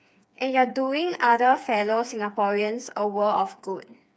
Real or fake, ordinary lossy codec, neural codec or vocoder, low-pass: fake; none; codec, 16 kHz, 4 kbps, FreqCodec, smaller model; none